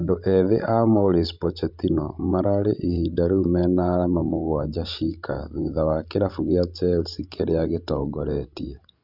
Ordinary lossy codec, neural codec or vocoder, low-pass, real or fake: none; codec, 16 kHz, 16 kbps, FreqCodec, larger model; 5.4 kHz; fake